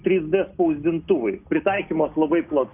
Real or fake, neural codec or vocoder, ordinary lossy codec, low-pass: real; none; AAC, 32 kbps; 3.6 kHz